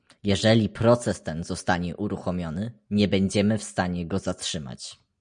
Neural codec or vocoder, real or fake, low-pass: none; real; 9.9 kHz